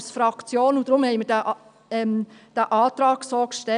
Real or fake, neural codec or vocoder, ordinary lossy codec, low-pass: real; none; none; 9.9 kHz